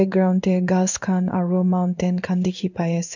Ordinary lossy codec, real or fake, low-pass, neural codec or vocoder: none; fake; 7.2 kHz; codec, 16 kHz in and 24 kHz out, 1 kbps, XY-Tokenizer